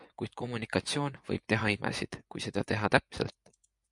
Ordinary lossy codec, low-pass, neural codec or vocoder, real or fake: MP3, 96 kbps; 10.8 kHz; vocoder, 24 kHz, 100 mel bands, Vocos; fake